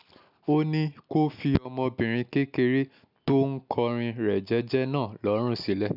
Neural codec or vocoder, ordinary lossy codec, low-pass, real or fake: none; none; 5.4 kHz; real